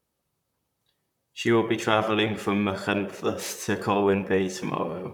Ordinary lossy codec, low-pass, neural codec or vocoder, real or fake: none; 19.8 kHz; vocoder, 44.1 kHz, 128 mel bands, Pupu-Vocoder; fake